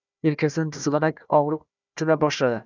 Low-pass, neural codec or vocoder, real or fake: 7.2 kHz; codec, 16 kHz, 1 kbps, FunCodec, trained on Chinese and English, 50 frames a second; fake